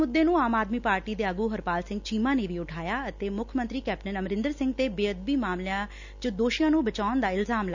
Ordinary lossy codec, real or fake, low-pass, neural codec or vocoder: none; real; 7.2 kHz; none